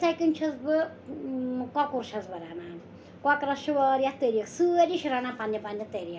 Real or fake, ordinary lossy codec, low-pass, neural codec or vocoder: real; none; none; none